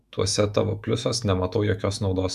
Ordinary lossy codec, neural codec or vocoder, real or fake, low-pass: MP3, 96 kbps; autoencoder, 48 kHz, 128 numbers a frame, DAC-VAE, trained on Japanese speech; fake; 14.4 kHz